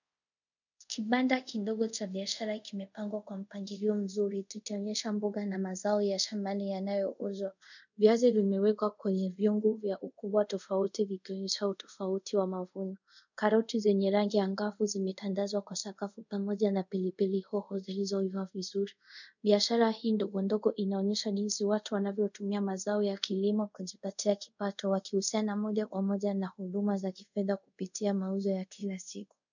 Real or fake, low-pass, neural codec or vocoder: fake; 7.2 kHz; codec, 24 kHz, 0.5 kbps, DualCodec